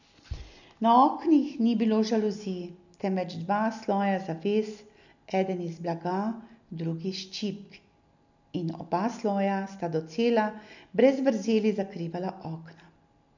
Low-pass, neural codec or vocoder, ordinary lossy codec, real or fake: 7.2 kHz; none; none; real